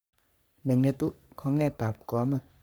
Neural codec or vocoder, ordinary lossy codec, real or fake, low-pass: codec, 44.1 kHz, 3.4 kbps, Pupu-Codec; none; fake; none